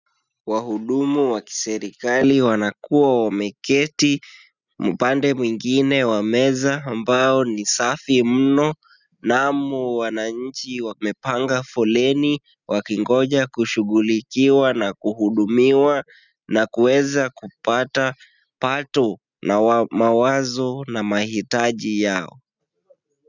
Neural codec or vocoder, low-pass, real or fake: none; 7.2 kHz; real